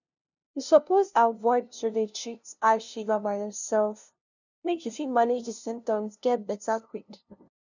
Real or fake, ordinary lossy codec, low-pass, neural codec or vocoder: fake; none; 7.2 kHz; codec, 16 kHz, 0.5 kbps, FunCodec, trained on LibriTTS, 25 frames a second